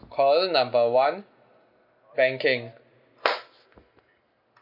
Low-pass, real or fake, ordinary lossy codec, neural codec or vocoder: 5.4 kHz; real; none; none